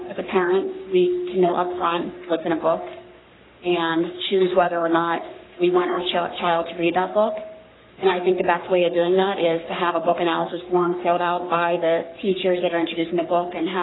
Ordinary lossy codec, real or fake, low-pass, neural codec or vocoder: AAC, 16 kbps; fake; 7.2 kHz; codec, 44.1 kHz, 3.4 kbps, Pupu-Codec